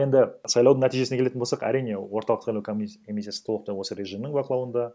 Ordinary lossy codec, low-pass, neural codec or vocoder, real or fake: none; none; none; real